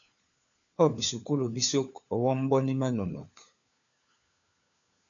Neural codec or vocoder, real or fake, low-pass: codec, 16 kHz, 4 kbps, FunCodec, trained on LibriTTS, 50 frames a second; fake; 7.2 kHz